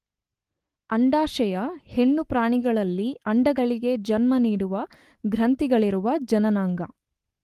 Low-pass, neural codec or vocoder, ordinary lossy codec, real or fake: 14.4 kHz; codec, 44.1 kHz, 7.8 kbps, Pupu-Codec; Opus, 24 kbps; fake